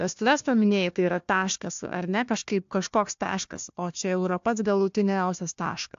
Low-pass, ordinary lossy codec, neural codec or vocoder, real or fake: 7.2 kHz; AAC, 64 kbps; codec, 16 kHz, 1 kbps, FunCodec, trained on Chinese and English, 50 frames a second; fake